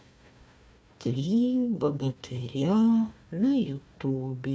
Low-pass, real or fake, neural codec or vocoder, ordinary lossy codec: none; fake; codec, 16 kHz, 1 kbps, FunCodec, trained on Chinese and English, 50 frames a second; none